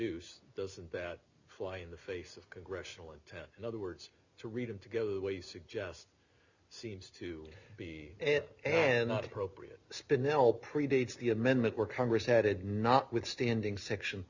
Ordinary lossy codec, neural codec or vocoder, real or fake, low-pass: Opus, 64 kbps; none; real; 7.2 kHz